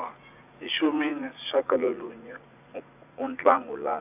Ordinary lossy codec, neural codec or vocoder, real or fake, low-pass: none; vocoder, 44.1 kHz, 80 mel bands, Vocos; fake; 3.6 kHz